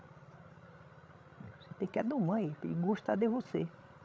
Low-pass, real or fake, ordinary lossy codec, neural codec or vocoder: none; fake; none; codec, 16 kHz, 16 kbps, FreqCodec, larger model